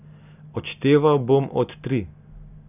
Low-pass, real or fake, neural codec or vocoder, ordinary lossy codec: 3.6 kHz; real; none; none